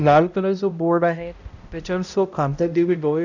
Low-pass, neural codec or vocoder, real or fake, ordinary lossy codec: 7.2 kHz; codec, 16 kHz, 0.5 kbps, X-Codec, HuBERT features, trained on balanced general audio; fake; none